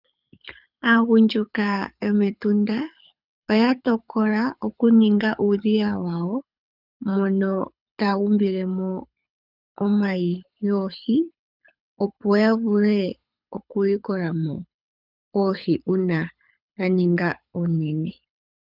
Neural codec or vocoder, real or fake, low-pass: codec, 24 kHz, 6 kbps, HILCodec; fake; 5.4 kHz